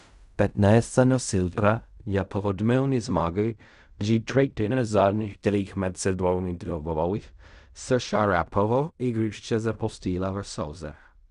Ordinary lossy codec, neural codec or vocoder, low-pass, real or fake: none; codec, 16 kHz in and 24 kHz out, 0.4 kbps, LongCat-Audio-Codec, fine tuned four codebook decoder; 10.8 kHz; fake